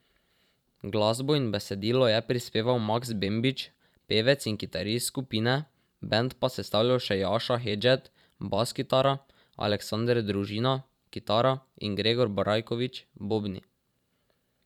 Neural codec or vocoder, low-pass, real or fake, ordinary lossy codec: vocoder, 44.1 kHz, 128 mel bands every 512 samples, BigVGAN v2; 19.8 kHz; fake; none